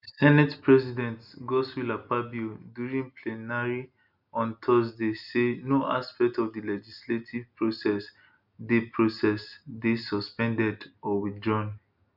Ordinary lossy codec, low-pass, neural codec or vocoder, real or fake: none; 5.4 kHz; none; real